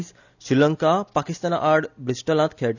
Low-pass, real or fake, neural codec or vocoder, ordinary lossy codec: 7.2 kHz; real; none; none